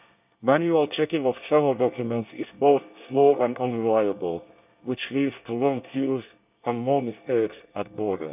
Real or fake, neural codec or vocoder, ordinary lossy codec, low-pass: fake; codec, 24 kHz, 1 kbps, SNAC; none; 3.6 kHz